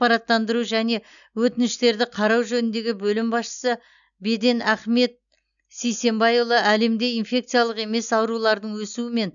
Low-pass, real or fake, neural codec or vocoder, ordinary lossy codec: 7.2 kHz; real; none; none